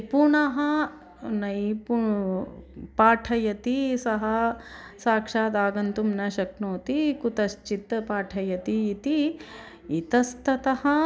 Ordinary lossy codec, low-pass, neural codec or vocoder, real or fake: none; none; none; real